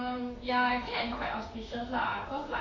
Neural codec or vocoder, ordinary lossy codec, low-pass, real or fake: autoencoder, 48 kHz, 32 numbers a frame, DAC-VAE, trained on Japanese speech; none; 7.2 kHz; fake